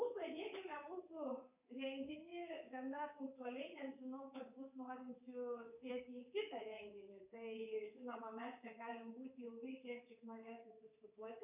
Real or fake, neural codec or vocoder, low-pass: fake; codec, 24 kHz, 3.1 kbps, DualCodec; 3.6 kHz